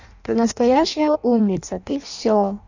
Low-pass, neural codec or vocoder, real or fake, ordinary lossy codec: 7.2 kHz; codec, 16 kHz in and 24 kHz out, 0.6 kbps, FireRedTTS-2 codec; fake; none